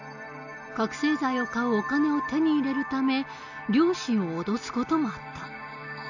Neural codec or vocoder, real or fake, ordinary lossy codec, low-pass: none; real; none; 7.2 kHz